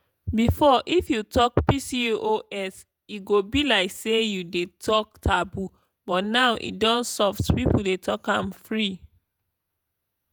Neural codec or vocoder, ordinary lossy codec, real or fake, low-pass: vocoder, 48 kHz, 128 mel bands, Vocos; none; fake; none